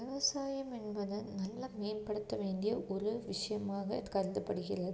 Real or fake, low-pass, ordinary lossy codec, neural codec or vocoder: real; none; none; none